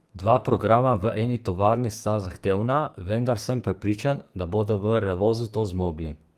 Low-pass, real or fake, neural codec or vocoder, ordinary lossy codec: 14.4 kHz; fake; codec, 44.1 kHz, 2.6 kbps, SNAC; Opus, 32 kbps